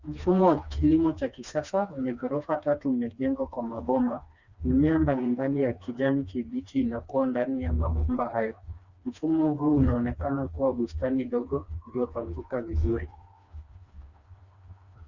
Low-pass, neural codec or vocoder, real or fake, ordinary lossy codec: 7.2 kHz; codec, 16 kHz, 2 kbps, FreqCodec, smaller model; fake; Opus, 64 kbps